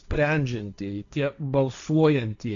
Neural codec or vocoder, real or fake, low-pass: codec, 16 kHz, 1.1 kbps, Voila-Tokenizer; fake; 7.2 kHz